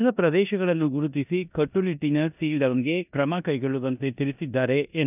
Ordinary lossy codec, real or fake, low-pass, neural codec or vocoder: none; fake; 3.6 kHz; codec, 16 kHz in and 24 kHz out, 0.9 kbps, LongCat-Audio-Codec, four codebook decoder